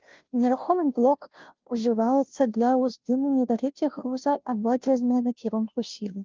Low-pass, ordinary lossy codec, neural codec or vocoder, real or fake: 7.2 kHz; Opus, 32 kbps; codec, 16 kHz, 0.5 kbps, FunCodec, trained on Chinese and English, 25 frames a second; fake